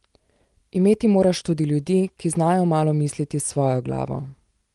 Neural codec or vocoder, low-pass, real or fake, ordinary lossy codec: none; 10.8 kHz; real; Opus, 24 kbps